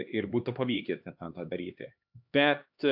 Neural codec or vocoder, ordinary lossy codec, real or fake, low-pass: codec, 16 kHz, 2 kbps, X-Codec, HuBERT features, trained on LibriSpeech; AAC, 48 kbps; fake; 5.4 kHz